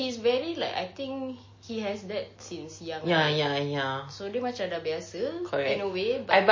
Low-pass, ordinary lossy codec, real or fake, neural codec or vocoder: 7.2 kHz; MP3, 32 kbps; real; none